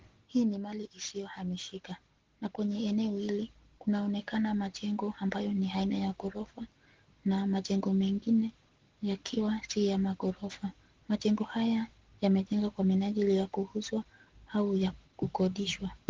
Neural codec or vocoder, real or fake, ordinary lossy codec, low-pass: none; real; Opus, 16 kbps; 7.2 kHz